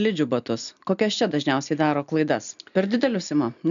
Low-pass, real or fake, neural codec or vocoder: 7.2 kHz; real; none